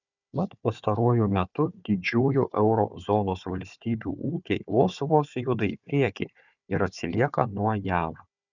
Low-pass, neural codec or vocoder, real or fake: 7.2 kHz; codec, 16 kHz, 4 kbps, FunCodec, trained on Chinese and English, 50 frames a second; fake